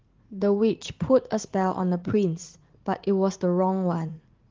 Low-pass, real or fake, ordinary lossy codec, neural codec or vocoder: 7.2 kHz; fake; Opus, 16 kbps; vocoder, 44.1 kHz, 80 mel bands, Vocos